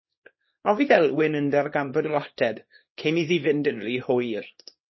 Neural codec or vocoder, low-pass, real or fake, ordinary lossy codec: codec, 24 kHz, 0.9 kbps, WavTokenizer, small release; 7.2 kHz; fake; MP3, 24 kbps